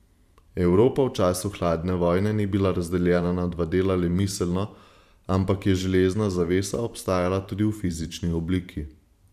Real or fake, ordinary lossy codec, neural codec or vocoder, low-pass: fake; none; vocoder, 44.1 kHz, 128 mel bands every 512 samples, BigVGAN v2; 14.4 kHz